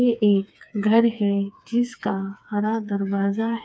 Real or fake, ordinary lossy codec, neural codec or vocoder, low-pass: fake; none; codec, 16 kHz, 4 kbps, FreqCodec, smaller model; none